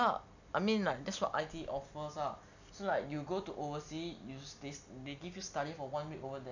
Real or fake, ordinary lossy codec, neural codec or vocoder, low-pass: real; none; none; 7.2 kHz